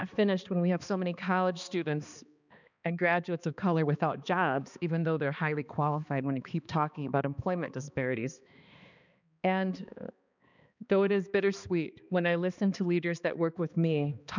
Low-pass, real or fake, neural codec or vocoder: 7.2 kHz; fake; codec, 16 kHz, 2 kbps, X-Codec, HuBERT features, trained on balanced general audio